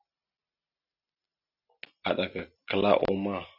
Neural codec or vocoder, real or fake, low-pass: none; real; 5.4 kHz